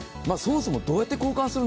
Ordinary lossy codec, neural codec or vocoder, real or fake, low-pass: none; none; real; none